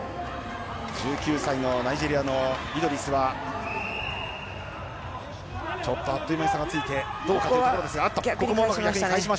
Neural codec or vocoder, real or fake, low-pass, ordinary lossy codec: none; real; none; none